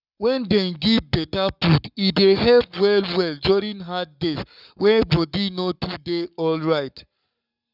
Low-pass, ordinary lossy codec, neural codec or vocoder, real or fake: 5.4 kHz; none; codec, 44.1 kHz, 7.8 kbps, Pupu-Codec; fake